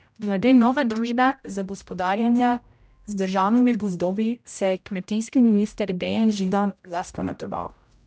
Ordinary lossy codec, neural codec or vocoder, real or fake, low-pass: none; codec, 16 kHz, 0.5 kbps, X-Codec, HuBERT features, trained on general audio; fake; none